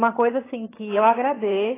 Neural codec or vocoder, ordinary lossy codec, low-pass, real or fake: vocoder, 22.05 kHz, 80 mel bands, HiFi-GAN; AAC, 16 kbps; 3.6 kHz; fake